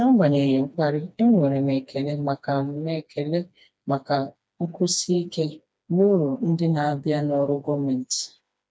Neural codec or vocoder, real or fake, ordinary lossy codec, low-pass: codec, 16 kHz, 2 kbps, FreqCodec, smaller model; fake; none; none